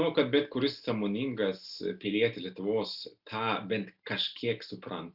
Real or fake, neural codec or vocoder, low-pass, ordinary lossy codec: real; none; 5.4 kHz; Opus, 64 kbps